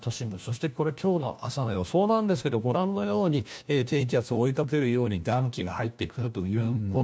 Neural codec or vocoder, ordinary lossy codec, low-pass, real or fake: codec, 16 kHz, 1 kbps, FunCodec, trained on LibriTTS, 50 frames a second; none; none; fake